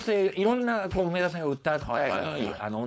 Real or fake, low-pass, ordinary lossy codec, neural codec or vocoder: fake; none; none; codec, 16 kHz, 4.8 kbps, FACodec